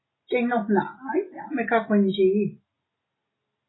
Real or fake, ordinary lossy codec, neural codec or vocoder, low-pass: real; AAC, 16 kbps; none; 7.2 kHz